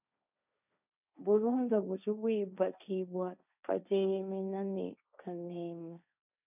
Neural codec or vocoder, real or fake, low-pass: codec, 16 kHz, 1.1 kbps, Voila-Tokenizer; fake; 3.6 kHz